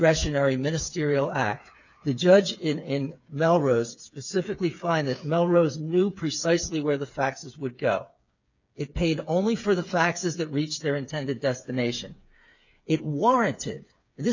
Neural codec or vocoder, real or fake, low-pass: codec, 16 kHz, 8 kbps, FreqCodec, smaller model; fake; 7.2 kHz